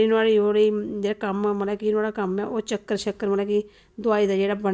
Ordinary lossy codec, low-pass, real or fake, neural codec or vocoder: none; none; real; none